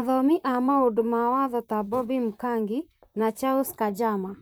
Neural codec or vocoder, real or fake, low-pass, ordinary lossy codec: vocoder, 44.1 kHz, 128 mel bands, Pupu-Vocoder; fake; none; none